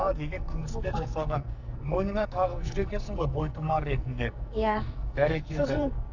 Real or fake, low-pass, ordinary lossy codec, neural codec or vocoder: fake; 7.2 kHz; none; codec, 44.1 kHz, 2.6 kbps, SNAC